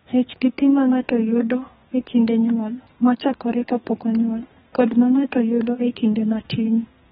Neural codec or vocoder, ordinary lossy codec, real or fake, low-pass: codec, 32 kHz, 1.9 kbps, SNAC; AAC, 16 kbps; fake; 14.4 kHz